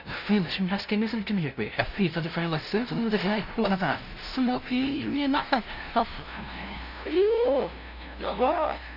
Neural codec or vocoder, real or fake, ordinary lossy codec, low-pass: codec, 16 kHz, 0.5 kbps, FunCodec, trained on LibriTTS, 25 frames a second; fake; none; 5.4 kHz